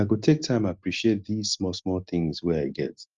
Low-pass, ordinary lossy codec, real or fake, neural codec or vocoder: 7.2 kHz; Opus, 32 kbps; real; none